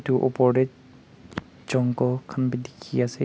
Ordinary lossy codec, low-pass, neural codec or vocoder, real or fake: none; none; none; real